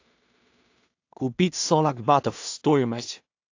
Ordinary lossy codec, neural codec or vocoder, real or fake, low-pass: AAC, 48 kbps; codec, 16 kHz in and 24 kHz out, 0.4 kbps, LongCat-Audio-Codec, two codebook decoder; fake; 7.2 kHz